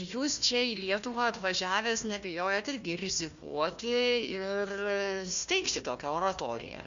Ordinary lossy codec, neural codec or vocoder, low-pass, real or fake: Opus, 64 kbps; codec, 16 kHz, 1 kbps, FunCodec, trained on Chinese and English, 50 frames a second; 7.2 kHz; fake